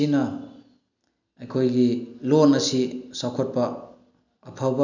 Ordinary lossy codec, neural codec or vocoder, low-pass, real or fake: none; none; 7.2 kHz; real